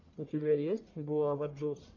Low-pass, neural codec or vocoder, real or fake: 7.2 kHz; codec, 44.1 kHz, 1.7 kbps, Pupu-Codec; fake